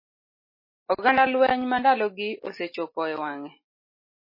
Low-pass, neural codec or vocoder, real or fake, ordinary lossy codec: 5.4 kHz; none; real; MP3, 24 kbps